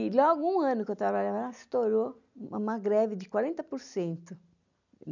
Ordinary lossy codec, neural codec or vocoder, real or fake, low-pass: none; none; real; 7.2 kHz